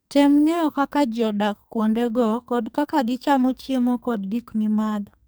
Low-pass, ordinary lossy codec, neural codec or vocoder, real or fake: none; none; codec, 44.1 kHz, 2.6 kbps, SNAC; fake